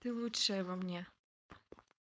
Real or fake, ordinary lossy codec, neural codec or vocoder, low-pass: fake; none; codec, 16 kHz, 4.8 kbps, FACodec; none